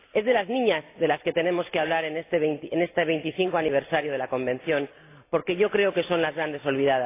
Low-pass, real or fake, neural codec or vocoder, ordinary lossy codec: 3.6 kHz; real; none; AAC, 24 kbps